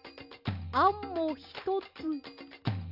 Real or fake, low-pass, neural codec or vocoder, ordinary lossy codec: real; 5.4 kHz; none; none